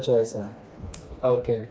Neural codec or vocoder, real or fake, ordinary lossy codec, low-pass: codec, 16 kHz, 2 kbps, FreqCodec, smaller model; fake; none; none